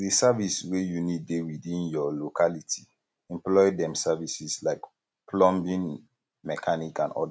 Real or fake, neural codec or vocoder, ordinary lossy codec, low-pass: real; none; none; none